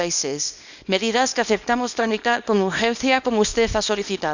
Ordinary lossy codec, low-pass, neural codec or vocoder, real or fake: none; 7.2 kHz; codec, 24 kHz, 0.9 kbps, WavTokenizer, small release; fake